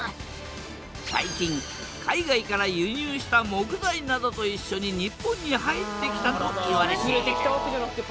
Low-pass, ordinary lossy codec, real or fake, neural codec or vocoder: none; none; real; none